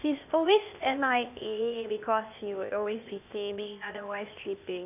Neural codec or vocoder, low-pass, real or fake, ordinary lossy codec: codec, 16 kHz, 0.8 kbps, ZipCodec; 3.6 kHz; fake; none